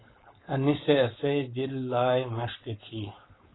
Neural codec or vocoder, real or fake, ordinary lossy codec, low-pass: codec, 16 kHz, 4.8 kbps, FACodec; fake; AAC, 16 kbps; 7.2 kHz